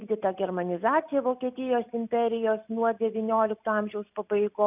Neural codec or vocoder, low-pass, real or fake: none; 3.6 kHz; real